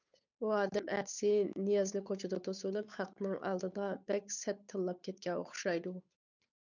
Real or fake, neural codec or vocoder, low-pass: fake; codec, 16 kHz, 8 kbps, FunCodec, trained on Chinese and English, 25 frames a second; 7.2 kHz